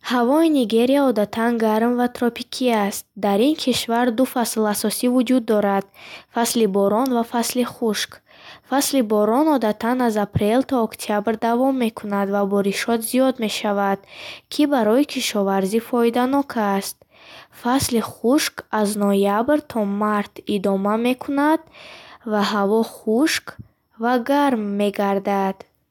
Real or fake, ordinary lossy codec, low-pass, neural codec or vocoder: real; none; 19.8 kHz; none